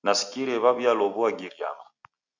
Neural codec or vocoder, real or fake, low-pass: none; real; 7.2 kHz